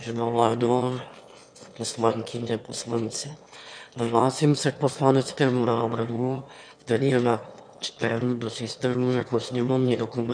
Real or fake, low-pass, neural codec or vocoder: fake; 9.9 kHz; autoencoder, 22.05 kHz, a latent of 192 numbers a frame, VITS, trained on one speaker